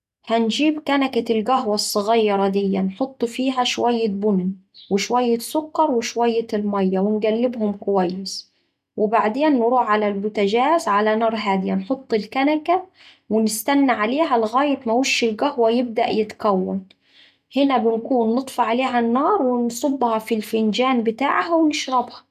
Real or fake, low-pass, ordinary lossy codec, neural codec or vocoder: real; 14.4 kHz; none; none